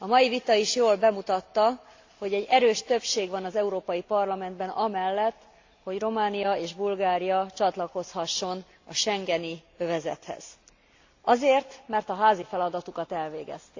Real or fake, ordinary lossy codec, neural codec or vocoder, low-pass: real; AAC, 48 kbps; none; 7.2 kHz